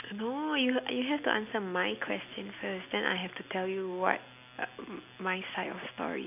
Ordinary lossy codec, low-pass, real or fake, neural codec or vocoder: none; 3.6 kHz; real; none